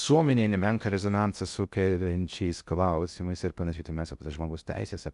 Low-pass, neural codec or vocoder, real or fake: 10.8 kHz; codec, 16 kHz in and 24 kHz out, 0.6 kbps, FocalCodec, streaming, 2048 codes; fake